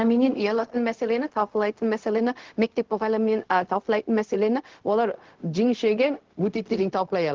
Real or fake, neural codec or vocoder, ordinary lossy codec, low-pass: fake; codec, 16 kHz, 0.4 kbps, LongCat-Audio-Codec; Opus, 16 kbps; 7.2 kHz